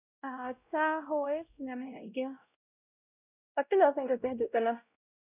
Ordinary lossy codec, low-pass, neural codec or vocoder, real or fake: none; 3.6 kHz; codec, 16 kHz, 0.5 kbps, X-Codec, WavLM features, trained on Multilingual LibriSpeech; fake